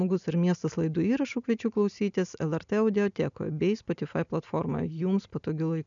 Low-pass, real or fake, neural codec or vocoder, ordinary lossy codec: 7.2 kHz; real; none; MP3, 96 kbps